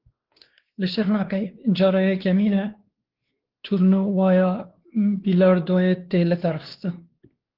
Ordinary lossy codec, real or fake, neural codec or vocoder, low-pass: Opus, 16 kbps; fake; codec, 16 kHz, 2 kbps, X-Codec, WavLM features, trained on Multilingual LibriSpeech; 5.4 kHz